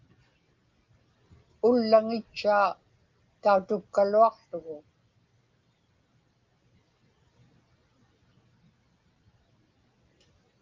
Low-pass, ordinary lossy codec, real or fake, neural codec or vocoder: 7.2 kHz; Opus, 24 kbps; real; none